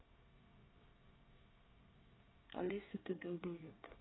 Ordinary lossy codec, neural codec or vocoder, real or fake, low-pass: AAC, 16 kbps; codec, 24 kHz, 1 kbps, SNAC; fake; 7.2 kHz